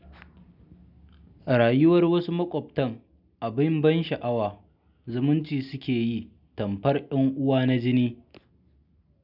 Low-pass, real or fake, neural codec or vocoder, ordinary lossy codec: 5.4 kHz; real; none; none